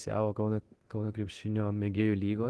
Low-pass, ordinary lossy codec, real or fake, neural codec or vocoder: 10.8 kHz; Opus, 16 kbps; fake; codec, 24 kHz, 0.9 kbps, DualCodec